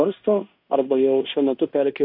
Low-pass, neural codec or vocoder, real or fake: 5.4 kHz; codec, 16 kHz in and 24 kHz out, 0.9 kbps, LongCat-Audio-Codec, fine tuned four codebook decoder; fake